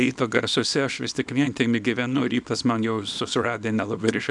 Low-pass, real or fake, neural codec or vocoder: 10.8 kHz; fake; codec, 24 kHz, 0.9 kbps, WavTokenizer, small release